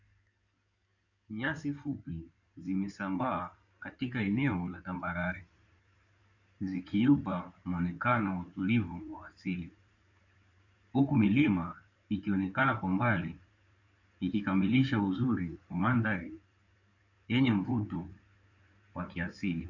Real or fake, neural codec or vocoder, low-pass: fake; codec, 16 kHz in and 24 kHz out, 2.2 kbps, FireRedTTS-2 codec; 7.2 kHz